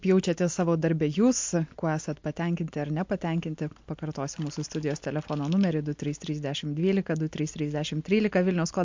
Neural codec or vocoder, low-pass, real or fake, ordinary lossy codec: none; 7.2 kHz; real; MP3, 48 kbps